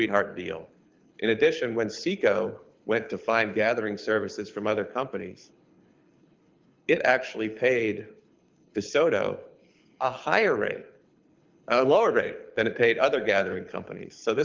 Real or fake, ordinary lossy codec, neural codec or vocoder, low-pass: fake; Opus, 24 kbps; codec, 24 kHz, 6 kbps, HILCodec; 7.2 kHz